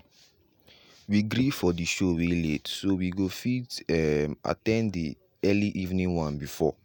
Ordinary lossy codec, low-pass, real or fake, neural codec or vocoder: none; none; real; none